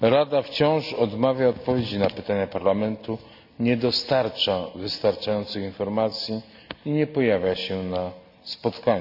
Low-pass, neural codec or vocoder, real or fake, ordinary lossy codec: 5.4 kHz; none; real; none